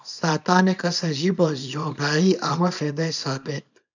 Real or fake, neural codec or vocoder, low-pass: fake; codec, 24 kHz, 0.9 kbps, WavTokenizer, small release; 7.2 kHz